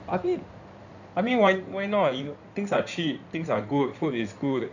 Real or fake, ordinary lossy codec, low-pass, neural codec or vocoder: fake; none; 7.2 kHz; codec, 16 kHz in and 24 kHz out, 2.2 kbps, FireRedTTS-2 codec